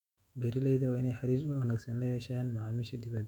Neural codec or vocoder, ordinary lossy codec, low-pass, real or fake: autoencoder, 48 kHz, 128 numbers a frame, DAC-VAE, trained on Japanese speech; none; 19.8 kHz; fake